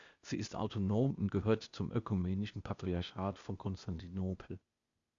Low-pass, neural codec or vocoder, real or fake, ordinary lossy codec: 7.2 kHz; codec, 16 kHz, 0.8 kbps, ZipCodec; fake; Opus, 64 kbps